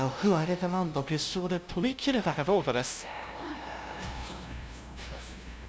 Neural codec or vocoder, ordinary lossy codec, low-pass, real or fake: codec, 16 kHz, 0.5 kbps, FunCodec, trained on LibriTTS, 25 frames a second; none; none; fake